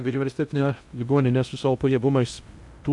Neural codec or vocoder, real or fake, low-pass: codec, 16 kHz in and 24 kHz out, 0.6 kbps, FocalCodec, streaming, 2048 codes; fake; 10.8 kHz